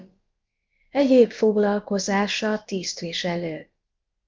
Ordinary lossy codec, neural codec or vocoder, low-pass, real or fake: Opus, 24 kbps; codec, 16 kHz, about 1 kbps, DyCAST, with the encoder's durations; 7.2 kHz; fake